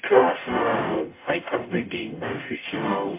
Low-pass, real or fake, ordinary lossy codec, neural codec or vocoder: 3.6 kHz; fake; MP3, 32 kbps; codec, 44.1 kHz, 0.9 kbps, DAC